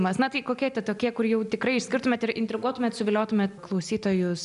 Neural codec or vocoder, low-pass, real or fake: none; 10.8 kHz; real